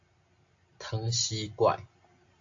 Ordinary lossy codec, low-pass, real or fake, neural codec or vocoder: AAC, 48 kbps; 7.2 kHz; real; none